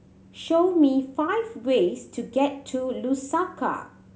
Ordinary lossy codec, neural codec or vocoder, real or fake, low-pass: none; none; real; none